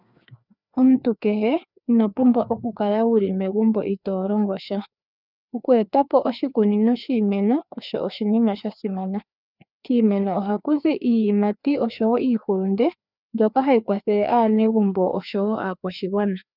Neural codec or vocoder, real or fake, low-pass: codec, 16 kHz, 2 kbps, FreqCodec, larger model; fake; 5.4 kHz